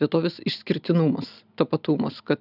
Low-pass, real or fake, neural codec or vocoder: 5.4 kHz; real; none